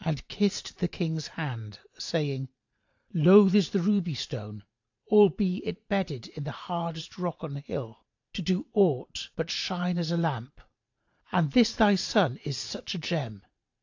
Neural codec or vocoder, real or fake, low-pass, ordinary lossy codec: vocoder, 44.1 kHz, 128 mel bands every 512 samples, BigVGAN v2; fake; 7.2 kHz; AAC, 48 kbps